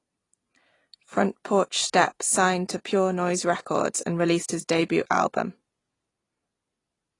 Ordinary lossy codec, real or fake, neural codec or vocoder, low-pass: AAC, 32 kbps; real; none; 10.8 kHz